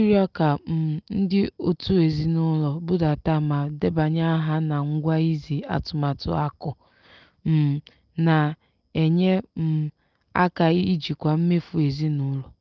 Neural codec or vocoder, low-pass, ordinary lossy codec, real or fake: none; 7.2 kHz; Opus, 24 kbps; real